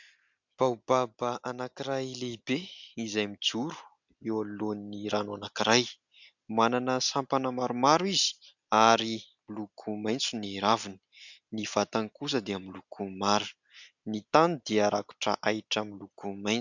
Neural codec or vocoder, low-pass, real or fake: none; 7.2 kHz; real